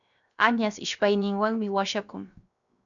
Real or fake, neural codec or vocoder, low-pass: fake; codec, 16 kHz, 0.7 kbps, FocalCodec; 7.2 kHz